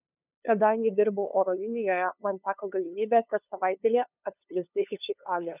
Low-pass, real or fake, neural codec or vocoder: 3.6 kHz; fake; codec, 16 kHz, 2 kbps, FunCodec, trained on LibriTTS, 25 frames a second